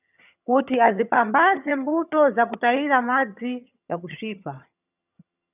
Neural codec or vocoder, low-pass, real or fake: vocoder, 22.05 kHz, 80 mel bands, HiFi-GAN; 3.6 kHz; fake